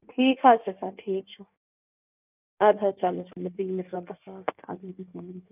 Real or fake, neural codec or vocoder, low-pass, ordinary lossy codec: fake; codec, 16 kHz in and 24 kHz out, 1.1 kbps, FireRedTTS-2 codec; 3.6 kHz; none